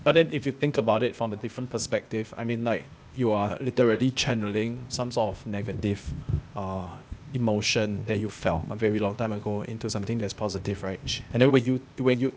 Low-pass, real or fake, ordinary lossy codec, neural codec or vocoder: none; fake; none; codec, 16 kHz, 0.8 kbps, ZipCodec